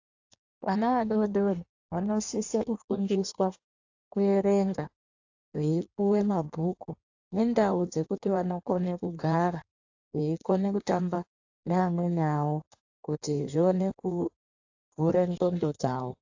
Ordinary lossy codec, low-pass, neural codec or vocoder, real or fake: AAC, 48 kbps; 7.2 kHz; codec, 16 kHz in and 24 kHz out, 1.1 kbps, FireRedTTS-2 codec; fake